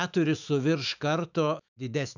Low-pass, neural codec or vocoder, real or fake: 7.2 kHz; autoencoder, 48 kHz, 128 numbers a frame, DAC-VAE, trained on Japanese speech; fake